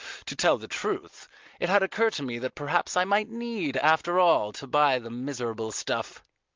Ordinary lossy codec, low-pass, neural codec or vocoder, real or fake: Opus, 32 kbps; 7.2 kHz; none; real